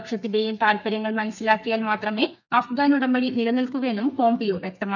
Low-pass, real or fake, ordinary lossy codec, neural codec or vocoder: 7.2 kHz; fake; none; codec, 32 kHz, 1.9 kbps, SNAC